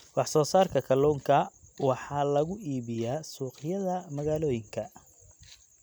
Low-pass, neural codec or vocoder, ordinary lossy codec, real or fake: none; none; none; real